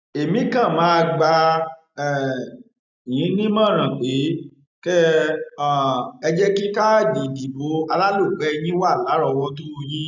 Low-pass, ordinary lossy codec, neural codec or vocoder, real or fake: 7.2 kHz; none; none; real